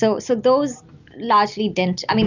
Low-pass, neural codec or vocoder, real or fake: 7.2 kHz; none; real